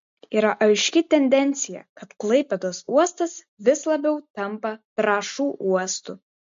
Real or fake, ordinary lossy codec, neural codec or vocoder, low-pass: real; MP3, 48 kbps; none; 7.2 kHz